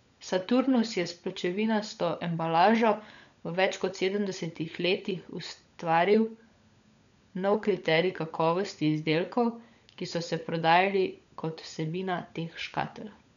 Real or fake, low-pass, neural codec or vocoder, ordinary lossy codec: fake; 7.2 kHz; codec, 16 kHz, 16 kbps, FunCodec, trained on LibriTTS, 50 frames a second; none